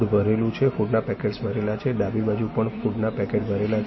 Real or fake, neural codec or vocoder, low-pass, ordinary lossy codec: real; none; 7.2 kHz; MP3, 24 kbps